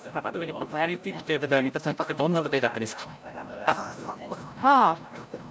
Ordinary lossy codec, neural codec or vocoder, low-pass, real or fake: none; codec, 16 kHz, 0.5 kbps, FreqCodec, larger model; none; fake